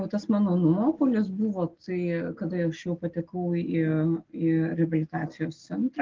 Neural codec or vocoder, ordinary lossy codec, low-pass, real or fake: none; Opus, 16 kbps; 7.2 kHz; real